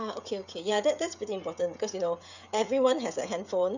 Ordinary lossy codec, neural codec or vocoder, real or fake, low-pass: none; codec, 16 kHz, 16 kbps, FreqCodec, smaller model; fake; 7.2 kHz